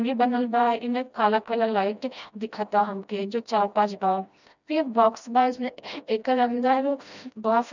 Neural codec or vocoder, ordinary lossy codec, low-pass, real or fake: codec, 16 kHz, 1 kbps, FreqCodec, smaller model; none; 7.2 kHz; fake